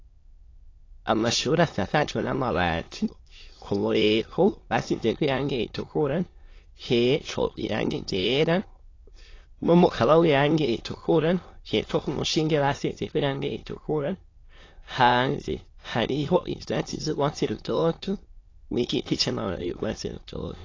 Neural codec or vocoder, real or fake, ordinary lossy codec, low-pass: autoencoder, 22.05 kHz, a latent of 192 numbers a frame, VITS, trained on many speakers; fake; AAC, 32 kbps; 7.2 kHz